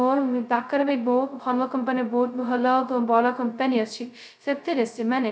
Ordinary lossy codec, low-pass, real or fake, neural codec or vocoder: none; none; fake; codec, 16 kHz, 0.2 kbps, FocalCodec